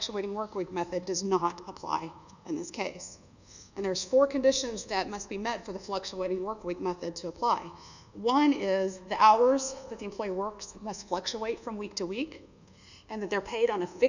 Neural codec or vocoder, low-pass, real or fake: codec, 24 kHz, 1.2 kbps, DualCodec; 7.2 kHz; fake